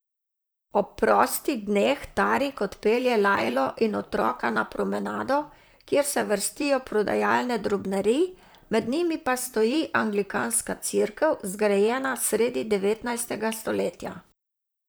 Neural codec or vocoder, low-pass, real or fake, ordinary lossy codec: vocoder, 44.1 kHz, 128 mel bands, Pupu-Vocoder; none; fake; none